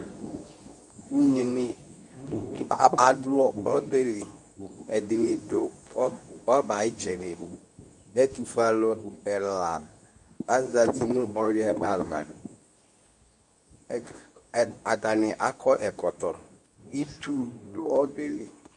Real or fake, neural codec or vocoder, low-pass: fake; codec, 24 kHz, 0.9 kbps, WavTokenizer, medium speech release version 2; 10.8 kHz